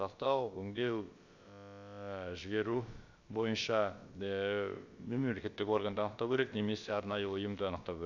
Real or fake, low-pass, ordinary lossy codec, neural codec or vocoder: fake; 7.2 kHz; Opus, 64 kbps; codec, 16 kHz, about 1 kbps, DyCAST, with the encoder's durations